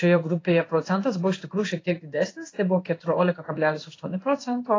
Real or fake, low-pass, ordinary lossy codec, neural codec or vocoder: fake; 7.2 kHz; AAC, 32 kbps; codec, 16 kHz in and 24 kHz out, 1 kbps, XY-Tokenizer